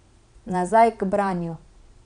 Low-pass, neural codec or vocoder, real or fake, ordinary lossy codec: 9.9 kHz; vocoder, 22.05 kHz, 80 mel bands, Vocos; fake; none